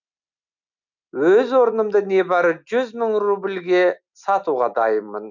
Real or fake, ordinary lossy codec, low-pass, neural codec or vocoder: real; none; 7.2 kHz; none